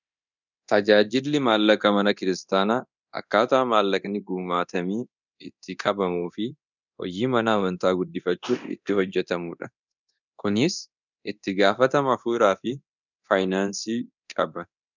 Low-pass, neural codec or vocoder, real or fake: 7.2 kHz; codec, 24 kHz, 0.9 kbps, DualCodec; fake